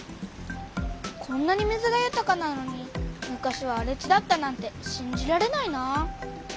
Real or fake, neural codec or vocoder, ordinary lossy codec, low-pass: real; none; none; none